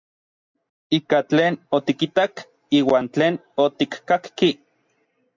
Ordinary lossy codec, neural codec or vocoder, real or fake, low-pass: MP3, 64 kbps; none; real; 7.2 kHz